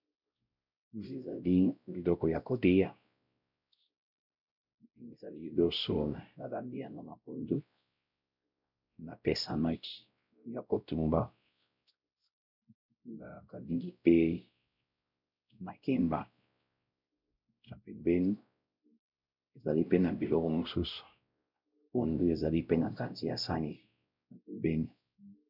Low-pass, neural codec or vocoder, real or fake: 5.4 kHz; codec, 16 kHz, 0.5 kbps, X-Codec, WavLM features, trained on Multilingual LibriSpeech; fake